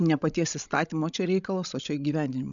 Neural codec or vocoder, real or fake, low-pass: codec, 16 kHz, 16 kbps, FreqCodec, larger model; fake; 7.2 kHz